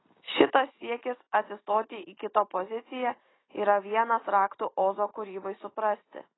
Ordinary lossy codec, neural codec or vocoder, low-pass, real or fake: AAC, 16 kbps; none; 7.2 kHz; real